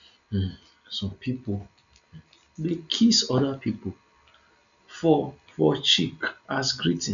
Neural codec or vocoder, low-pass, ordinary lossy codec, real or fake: none; 7.2 kHz; none; real